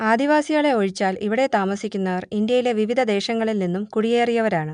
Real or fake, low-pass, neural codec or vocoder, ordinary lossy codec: real; 9.9 kHz; none; none